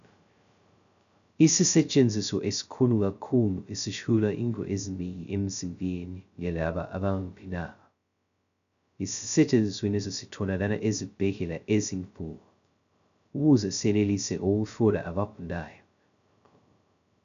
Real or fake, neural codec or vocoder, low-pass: fake; codec, 16 kHz, 0.2 kbps, FocalCodec; 7.2 kHz